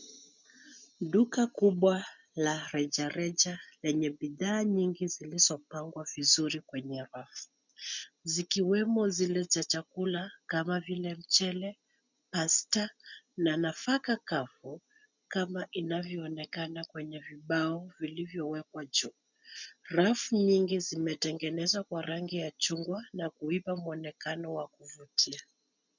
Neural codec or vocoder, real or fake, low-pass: none; real; 7.2 kHz